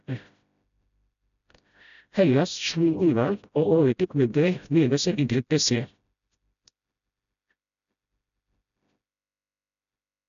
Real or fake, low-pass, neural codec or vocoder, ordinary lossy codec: fake; 7.2 kHz; codec, 16 kHz, 0.5 kbps, FreqCodec, smaller model; none